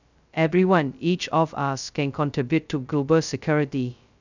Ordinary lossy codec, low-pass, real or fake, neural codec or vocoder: none; 7.2 kHz; fake; codec, 16 kHz, 0.2 kbps, FocalCodec